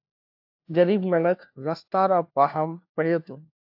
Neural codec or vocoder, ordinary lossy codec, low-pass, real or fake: codec, 16 kHz, 1 kbps, FunCodec, trained on LibriTTS, 50 frames a second; AAC, 48 kbps; 5.4 kHz; fake